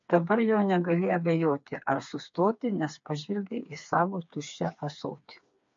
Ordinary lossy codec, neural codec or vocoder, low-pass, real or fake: MP3, 48 kbps; codec, 16 kHz, 4 kbps, FreqCodec, smaller model; 7.2 kHz; fake